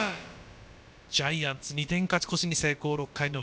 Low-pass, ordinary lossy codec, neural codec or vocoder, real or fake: none; none; codec, 16 kHz, about 1 kbps, DyCAST, with the encoder's durations; fake